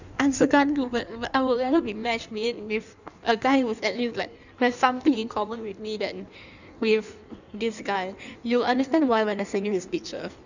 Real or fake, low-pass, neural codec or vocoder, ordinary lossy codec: fake; 7.2 kHz; codec, 16 kHz in and 24 kHz out, 1.1 kbps, FireRedTTS-2 codec; none